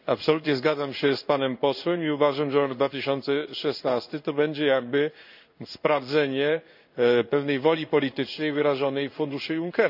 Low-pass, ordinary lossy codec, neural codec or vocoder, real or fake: 5.4 kHz; none; codec, 16 kHz in and 24 kHz out, 1 kbps, XY-Tokenizer; fake